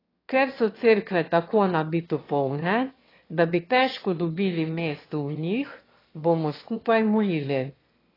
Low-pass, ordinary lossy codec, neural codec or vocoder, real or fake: 5.4 kHz; AAC, 24 kbps; autoencoder, 22.05 kHz, a latent of 192 numbers a frame, VITS, trained on one speaker; fake